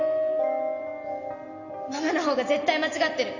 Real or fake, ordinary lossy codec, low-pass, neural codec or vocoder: real; none; 7.2 kHz; none